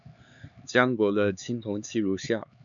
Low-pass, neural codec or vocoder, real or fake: 7.2 kHz; codec, 16 kHz, 4 kbps, X-Codec, HuBERT features, trained on LibriSpeech; fake